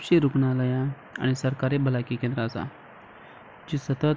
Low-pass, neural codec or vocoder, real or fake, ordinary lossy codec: none; none; real; none